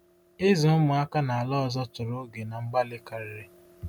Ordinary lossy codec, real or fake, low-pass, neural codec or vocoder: none; real; 19.8 kHz; none